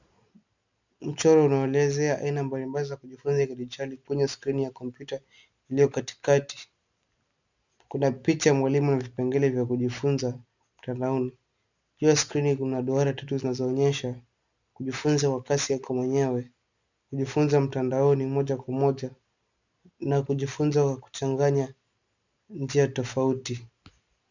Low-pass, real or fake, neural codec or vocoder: 7.2 kHz; real; none